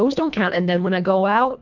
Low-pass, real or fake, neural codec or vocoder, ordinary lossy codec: 7.2 kHz; fake; codec, 24 kHz, 1.5 kbps, HILCodec; MP3, 64 kbps